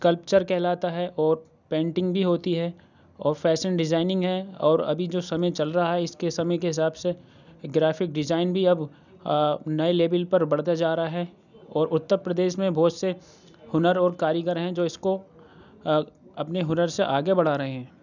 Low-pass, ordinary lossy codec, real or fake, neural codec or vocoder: 7.2 kHz; none; real; none